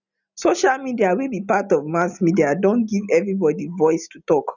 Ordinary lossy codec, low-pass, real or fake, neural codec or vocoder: none; 7.2 kHz; real; none